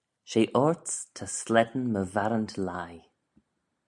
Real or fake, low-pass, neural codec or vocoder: real; 10.8 kHz; none